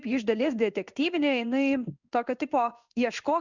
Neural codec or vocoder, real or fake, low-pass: codec, 16 kHz in and 24 kHz out, 1 kbps, XY-Tokenizer; fake; 7.2 kHz